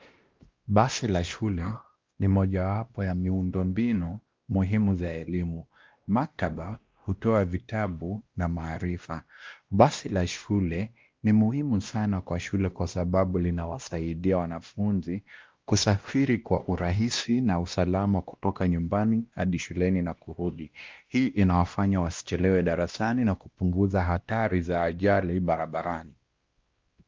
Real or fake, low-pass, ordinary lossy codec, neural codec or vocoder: fake; 7.2 kHz; Opus, 32 kbps; codec, 16 kHz, 1 kbps, X-Codec, WavLM features, trained on Multilingual LibriSpeech